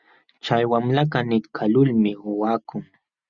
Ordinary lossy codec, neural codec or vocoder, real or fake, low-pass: Opus, 64 kbps; none; real; 7.2 kHz